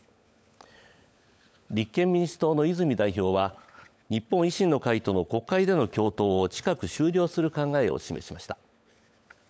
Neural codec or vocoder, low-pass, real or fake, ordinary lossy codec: codec, 16 kHz, 16 kbps, FunCodec, trained on LibriTTS, 50 frames a second; none; fake; none